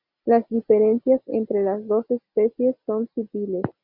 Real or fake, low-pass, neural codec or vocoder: real; 5.4 kHz; none